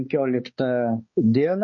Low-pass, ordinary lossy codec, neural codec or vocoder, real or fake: 7.2 kHz; MP3, 32 kbps; codec, 16 kHz, 4 kbps, X-Codec, HuBERT features, trained on balanced general audio; fake